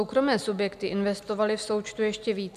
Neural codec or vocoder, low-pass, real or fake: none; 14.4 kHz; real